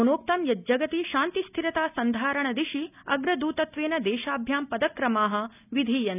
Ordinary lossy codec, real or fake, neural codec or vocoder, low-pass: none; real; none; 3.6 kHz